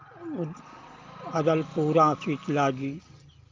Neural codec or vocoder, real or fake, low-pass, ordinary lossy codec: none; real; 7.2 kHz; Opus, 24 kbps